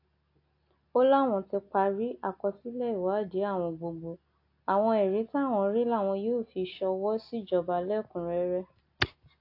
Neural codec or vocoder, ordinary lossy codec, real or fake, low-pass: none; AAC, 32 kbps; real; 5.4 kHz